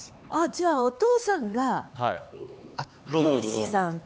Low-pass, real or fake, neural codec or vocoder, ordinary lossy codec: none; fake; codec, 16 kHz, 4 kbps, X-Codec, HuBERT features, trained on LibriSpeech; none